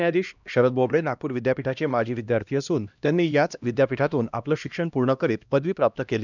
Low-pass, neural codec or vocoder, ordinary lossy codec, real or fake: 7.2 kHz; codec, 16 kHz, 1 kbps, X-Codec, HuBERT features, trained on LibriSpeech; none; fake